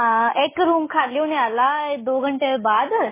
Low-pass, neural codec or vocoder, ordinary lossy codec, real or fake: 3.6 kHz; none; MP3, 16 kbps; real